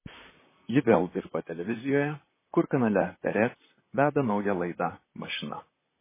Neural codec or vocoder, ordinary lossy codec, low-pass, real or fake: vocoder, 44.1 kHz, 128 mel bands, Pupu-Vocoder; MP3, 16 kbps; 3.6 kHz; fake